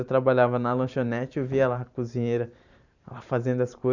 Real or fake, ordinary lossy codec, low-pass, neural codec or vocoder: fake; none; 7.2 kHz; vocoder, 44.1 kHz, 128 mel bands every 512 samples, BigVGAN v2